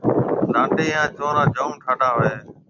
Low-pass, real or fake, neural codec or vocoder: 7.2 kHz; real; none